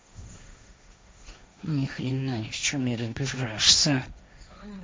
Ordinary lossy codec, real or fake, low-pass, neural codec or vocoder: none; fake; none; codec, 16 kHz, 1.1 kbps, Voila-Tokenizer